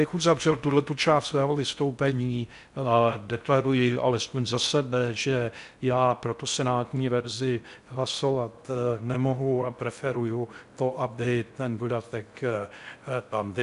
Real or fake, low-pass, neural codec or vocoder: fake; 10.8 kHz; codec, 16 kHz in and 24 kHz out, 0.6 kbps, FocalCodec, streaming, 4096 codes